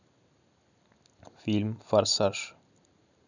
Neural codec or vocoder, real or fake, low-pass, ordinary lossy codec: none; real; 7.2 kHz; none